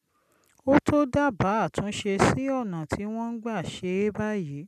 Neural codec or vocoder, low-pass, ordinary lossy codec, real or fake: none; 14.4 kHz; none; real